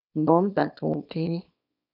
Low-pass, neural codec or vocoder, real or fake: 5.4 kHz; codec, 24 kHz, 0.9 kbps, WavTokenizer, small release; fake